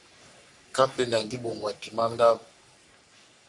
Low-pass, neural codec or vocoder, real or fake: 10.8 kHz; codec, 44.1 kHz, 3.4 kbps, Pupu-Codec; fake